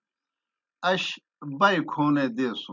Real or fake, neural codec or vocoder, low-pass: real; none; 7.2 kHz